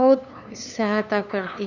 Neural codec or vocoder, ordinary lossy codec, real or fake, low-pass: codec, 16 kHz, 2 kbps, FunCodec, trained on LibriTTS, 25 frames a second; none; fake; 7.2 kHz